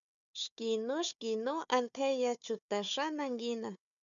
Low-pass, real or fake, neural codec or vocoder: 7.2 kHz; fake; codec, 16 kHz, 4 kbps, FunCodec, trained on Chinese and English, 50 frames a second